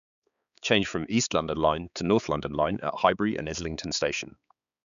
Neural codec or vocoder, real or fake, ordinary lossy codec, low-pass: codec, 16 kHz, 4 kbps, X-Codec, HuBERT features, trained on balanced general audio; fake; none; 7.2 kHz